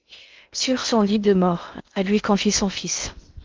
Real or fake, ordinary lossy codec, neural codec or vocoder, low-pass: fake; Opus, 24 kbps; codec, 16 kHz in and 24 kHz out, 0.6 kbps, FocalCodec, streaming, 2048 codes; 7.2 kHz